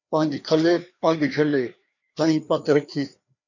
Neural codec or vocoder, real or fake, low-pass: codec, 16 kHz, 2 kbps, FreqCodec, larger model; fake; 7.2 kHz